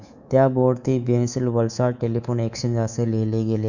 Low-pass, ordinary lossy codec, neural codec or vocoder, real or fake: 7.2 kHz; none; codec, 24 kHz, 3.1 kbps, DualCodec; fake